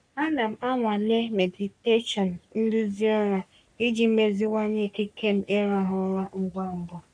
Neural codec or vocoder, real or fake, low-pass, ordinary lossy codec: codec, 44.1 kHz, 3.4 kbps, Pupu-Codec; fake; 9.9 kHz; none